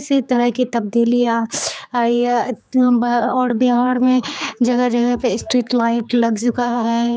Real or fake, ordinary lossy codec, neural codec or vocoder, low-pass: fake; none; codec, 16 kHz, 4 kbps, X-Codec, HuBERT features, trained on general audio; none